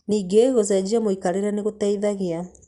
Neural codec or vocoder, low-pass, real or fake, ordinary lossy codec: none; 10.8 kHz; real; Opus, 64 kbps